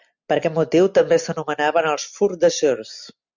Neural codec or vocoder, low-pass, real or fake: none; 7.2 kHz; real